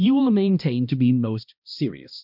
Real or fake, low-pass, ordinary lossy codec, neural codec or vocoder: fake; 5.4 kHz; MP3, 48 kbps; codec, 16 kHz, 1 kbps, X-Codec, HuBERT features, trained on balanced general audio